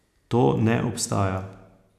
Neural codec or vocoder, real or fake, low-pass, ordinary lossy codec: none; real; 14.4 kHz; none